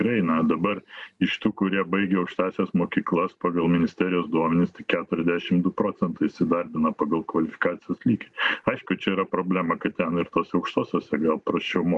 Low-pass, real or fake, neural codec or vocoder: 10.8 kHz; real; none